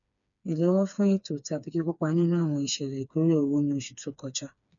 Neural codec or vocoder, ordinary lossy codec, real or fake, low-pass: codec, 16 kHz, 4 kbps, FreqCodec, smaller model; none; fake; 7.2 kHz